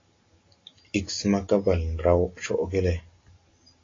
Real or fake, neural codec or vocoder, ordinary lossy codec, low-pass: real; none; AAC, 32 kbps; 7.2 kHz